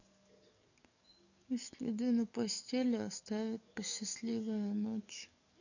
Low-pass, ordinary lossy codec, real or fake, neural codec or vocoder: 7.2 kHz; none; fake; codec, 44.1 kHz, 7.8 kbps, DAC